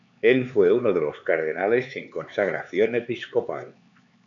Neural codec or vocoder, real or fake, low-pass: codec, 16 kHz, 4 kbps, X-Codec, HuBERT features, trained on LibriSpeech; fake; 7.2 kHz